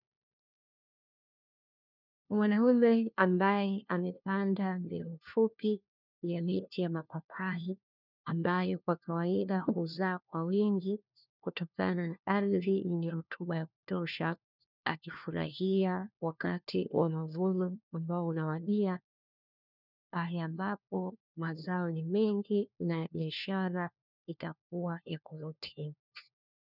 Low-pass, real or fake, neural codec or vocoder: 5.4 kHz; fake; codec, 16 kHz, 1 kbps, FunCodec, trained on LibriTTS, 50 frames a second